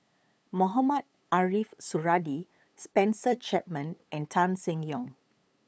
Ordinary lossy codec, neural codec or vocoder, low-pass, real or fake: none; codec, 16 kHz, 8 kbps, FunCodec, trained on LibriTTS, 25 frames a second; none; fake